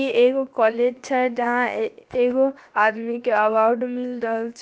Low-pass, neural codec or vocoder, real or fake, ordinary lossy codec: none; codec, 16 kHz, 0.7 kbps, FocalCodec; fake; none